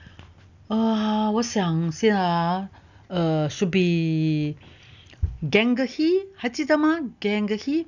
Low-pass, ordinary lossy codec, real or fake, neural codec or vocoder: 7.2 kHz; none; real; none